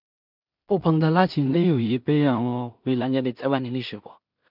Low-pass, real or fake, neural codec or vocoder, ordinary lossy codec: 5.4 kHz; fake; codec, 16 kHz in and 24 kHz out, 0.4 kbps, LongCat-Audio-Codec, two codebook decoder; none